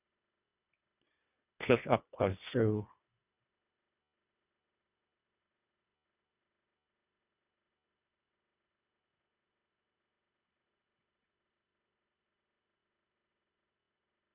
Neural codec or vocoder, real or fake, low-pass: codec, 24 kHz, 1.5 kbps, HILCodec; fake; 3.6 kHz